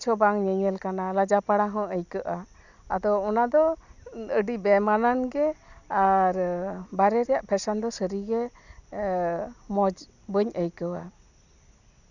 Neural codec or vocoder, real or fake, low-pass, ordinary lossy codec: none; real; 7.2 kHz; none